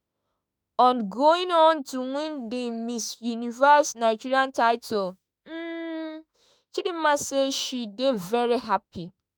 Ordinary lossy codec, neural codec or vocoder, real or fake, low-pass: none; autoencoder, 48 kHz, 32 numbers a frame, DAC-VAE, trained on Japanese speech; fake; none